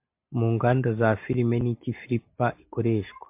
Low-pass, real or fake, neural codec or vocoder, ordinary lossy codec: 3.6 kHz; real; none; MP3, 32 kbps